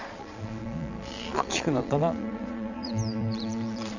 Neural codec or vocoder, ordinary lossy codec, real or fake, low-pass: vocoder, 22.05 kHz, 80 mel bands, Vocos; none; fake; 7.2 kHz